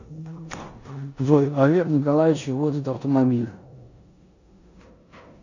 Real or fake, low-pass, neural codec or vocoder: fake; 7.2 kHz; codec, 16 kHz in and 24 kHz out, 0.9 kbps, LongCat-Audio-Codec, four codebook decoder